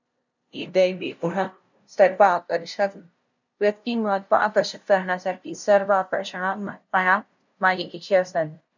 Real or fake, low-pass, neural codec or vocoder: fake; 7.2 kHz; codec, 16 kHz, 0.5 kbps, FunCodec, trained on LibriTTS, 25 frames a second